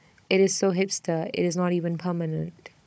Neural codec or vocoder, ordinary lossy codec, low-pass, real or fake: codec, 16 kHz, 16 kbps, FunCodec, trained on Chinese and English, 50 frames a second; none; none; fake